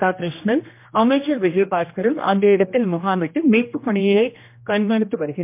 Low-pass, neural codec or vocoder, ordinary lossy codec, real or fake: 3.6 kHz; codec, 16 kHz, 1 kbps, X-Codec, HuBERT features, trained on general audio; MP3, 32 kbps; fake